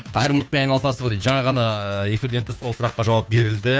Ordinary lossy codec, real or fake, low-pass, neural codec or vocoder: none; fake; none; codec, 16 kHz, 2 kbps, FunCodec, trained on Chinese and English, 25 frames a second